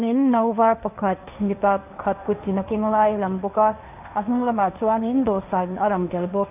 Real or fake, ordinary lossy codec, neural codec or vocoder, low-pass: fake; none; codec, 16 kHz, 1.1 kbps, Voila-Tokenizer; 3.6 kHz